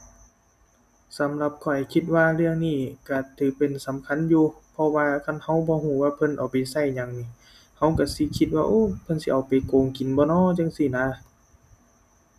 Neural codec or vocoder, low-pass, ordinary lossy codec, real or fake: none; 14.4 kHz; none; real